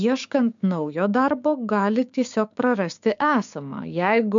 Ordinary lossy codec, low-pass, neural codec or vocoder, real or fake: MP3, 64 kbps; 7.2 kHz; codec, 16 kHz, 6 kbps, DAC; fake